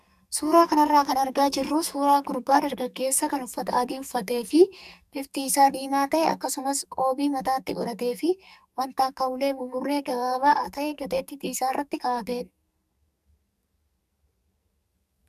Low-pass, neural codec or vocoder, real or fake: 14.4 kHz; codec, 44.1 kHz, 2.6 kbps, SNAC; fake